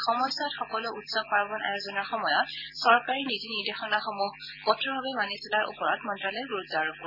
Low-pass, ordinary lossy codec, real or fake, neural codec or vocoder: 5.4 kHz; none; real; none